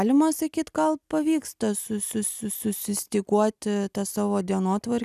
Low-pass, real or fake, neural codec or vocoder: 14.4 kHz; real; none